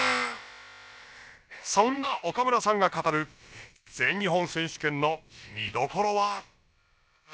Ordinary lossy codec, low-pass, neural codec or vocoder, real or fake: none; none; codec, 16 kHz, about 1 kbps, DyCAST, with the encoder's durations; fake